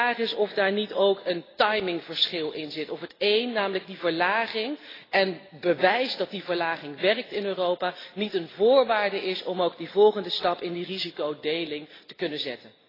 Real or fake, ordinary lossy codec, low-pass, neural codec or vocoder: real; AAC, 24 kbps; 5.4 kHz; none